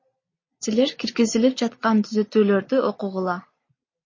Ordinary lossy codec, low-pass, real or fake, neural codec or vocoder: MP3, 32 kbps; 7.2 kHz; real; none